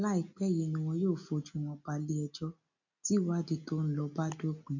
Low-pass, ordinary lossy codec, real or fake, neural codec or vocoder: 7.2 kHz; none; real; none